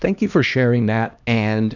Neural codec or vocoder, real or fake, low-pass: codec, 16 kHz, 1 kbps, X-Codec, HuBERT features, trained on LibriSpeech; fake; 7.2 kHz